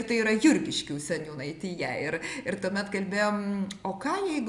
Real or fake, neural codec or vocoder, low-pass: real; none; 10.8 kHz